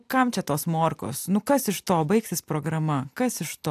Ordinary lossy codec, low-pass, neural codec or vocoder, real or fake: AAC, 96 kbps; 14.4 kHz; none; real